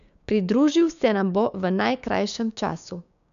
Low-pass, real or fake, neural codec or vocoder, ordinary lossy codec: 7.2 kHz; fake; codec, 16 kHz, 6 kbps, DAC; none